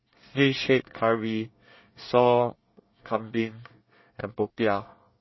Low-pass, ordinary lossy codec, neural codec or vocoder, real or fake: 7.2 kHz; MP3, 24 kbps; codec, 24 kHz, 1 kbps, SNAC; fake